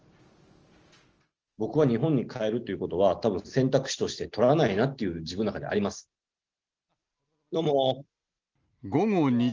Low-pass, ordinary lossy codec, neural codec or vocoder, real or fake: 7.2 kHz; Opus, 24 kbps; none; real